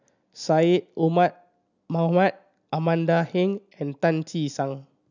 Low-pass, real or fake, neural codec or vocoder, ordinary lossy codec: 7.2 kHz; real; none; none